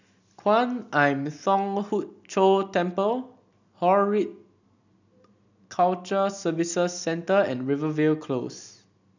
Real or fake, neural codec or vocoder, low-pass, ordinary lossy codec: real; none; 7.2 kHz; none